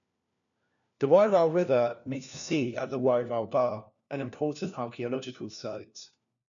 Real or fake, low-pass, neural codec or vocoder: fake; 7.2 kHz; codec, 16 kHz, 1 kbps, FunCodec, trained on LibriTTS, 50 frames a second